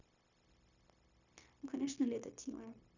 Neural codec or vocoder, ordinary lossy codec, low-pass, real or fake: codec, 16 kHz, 0.9 kbps, LongCat-Audio-Codec; none; 7.2 kHz; fake